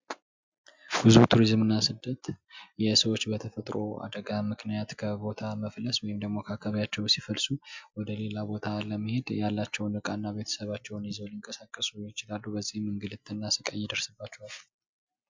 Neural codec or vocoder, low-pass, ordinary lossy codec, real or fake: none; 7.2 kHz; MP3, 64 kbps; real